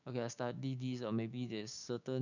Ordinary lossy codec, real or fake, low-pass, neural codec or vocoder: none; fake; 7.2 kHz; codec, 16 kHz, 6 kbps, DAC